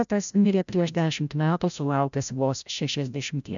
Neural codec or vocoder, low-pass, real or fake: codec, 16 kHz, 0.5 kbps, FreqCodec, larger model; 7.2 kHz; fake